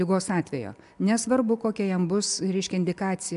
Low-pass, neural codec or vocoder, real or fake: 10.8 kHz; none; real